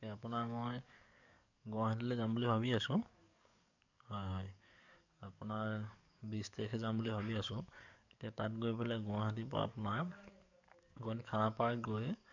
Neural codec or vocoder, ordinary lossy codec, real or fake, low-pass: codec, 44.1 kHz, 7.8 kbps, DAC; none; fake; 7.2 kHz